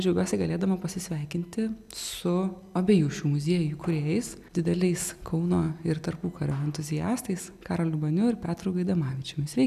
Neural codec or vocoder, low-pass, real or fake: none; 14.4 kHz; real